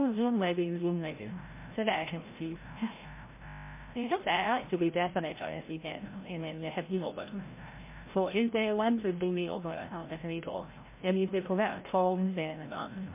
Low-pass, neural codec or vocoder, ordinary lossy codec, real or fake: 3.6 kHz; codec, 16 kHz, 0.5 kbps, FreqCodec, larger model; MP3, 24 kbps; fake